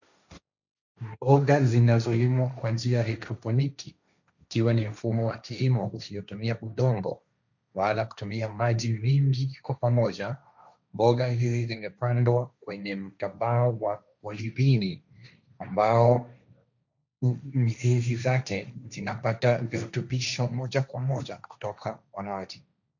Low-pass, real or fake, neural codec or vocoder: 7.2 kHz; fake; codec, 16 kHz, 1.1 kbps, Voila-Tokenizer